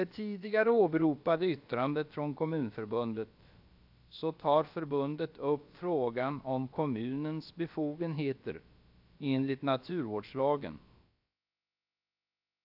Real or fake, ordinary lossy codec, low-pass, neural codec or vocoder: fake; AAC, 48 kbps; 5.4 kHz; codec, 16 kHz, about 1 kbps, DyCAST, with the encoder's durations